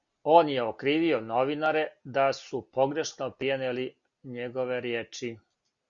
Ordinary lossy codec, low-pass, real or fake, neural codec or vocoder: Opus, 64 kbps; 7.2 kHz; real; none